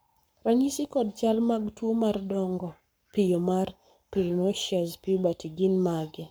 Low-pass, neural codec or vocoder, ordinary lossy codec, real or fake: none; codec, 44.1 kHz, 7.8 kbps, Pupu-Codec; none; fake